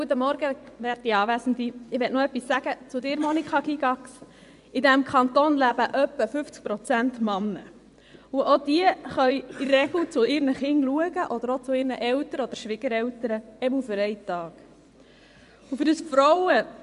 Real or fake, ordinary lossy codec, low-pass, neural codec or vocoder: fake; none; 10.8 kHz; vocoder, 24 kHz, 100 mel bands, Vocos